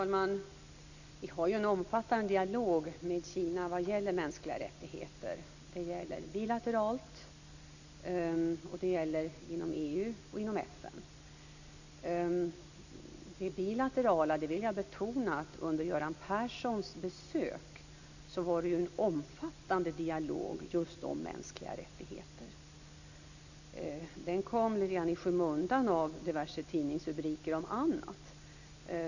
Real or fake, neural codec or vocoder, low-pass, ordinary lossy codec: real; none; 7.2 kHz; none